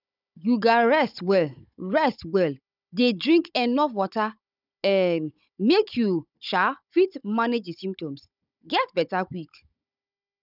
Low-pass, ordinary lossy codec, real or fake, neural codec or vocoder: 5.4 kHz; none; fake; codec, 16 kHz, 16 kbps, FunCodec, trained on Chinese and English, 50 frames a second